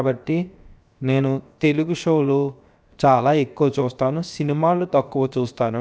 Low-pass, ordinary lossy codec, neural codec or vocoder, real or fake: none; none; codec, 16 kHz, 0.7 kbps, FocalCodec; fake